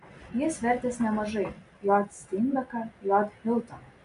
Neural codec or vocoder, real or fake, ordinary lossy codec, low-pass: none; real; MP3, 96 kbps; 10.8 kHz